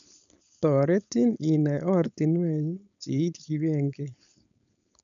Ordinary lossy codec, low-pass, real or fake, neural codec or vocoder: none; 7.2 kHz; fake; codec, 16 kHz, 4.8 kbps, FACodec